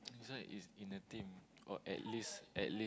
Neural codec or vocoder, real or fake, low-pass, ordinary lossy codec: none; real; none; none